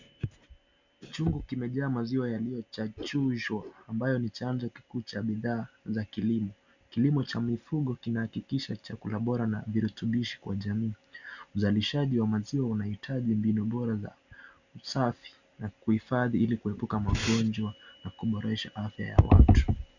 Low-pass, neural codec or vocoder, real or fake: 7.2 kHz; none; real